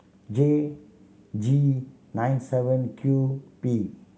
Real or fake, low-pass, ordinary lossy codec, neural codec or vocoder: real; none; none; none